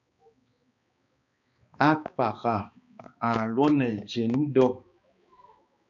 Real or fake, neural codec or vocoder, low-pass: fake; codec, 16 kHz, 2 kbps, X-Codec, HuBERT features, trained on balanced general audio; 7.2 kHz